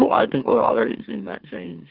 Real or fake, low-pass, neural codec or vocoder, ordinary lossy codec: fake; 5.4 kHz; autoencoder, 44.1 kHz, a latent of 192 numbers a frame, MeloTTS; Opus, 16 kbps